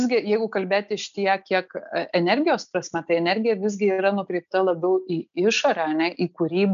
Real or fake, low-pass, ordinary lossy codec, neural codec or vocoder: real; 7.2 kHz; AAC, 96 kbps; none